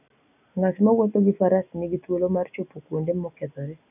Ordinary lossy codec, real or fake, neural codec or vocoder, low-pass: none; real; none; 3.6 kHz